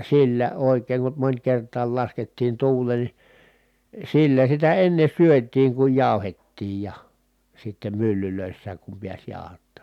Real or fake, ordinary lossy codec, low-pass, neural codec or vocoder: real; none; 19.8 kHz; none